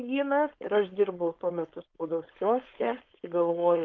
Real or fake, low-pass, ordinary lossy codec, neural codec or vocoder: fake; 7.2 kHz; Opus, 24 kbps; codec, 16 kHz, 4.8 kbps, FACodec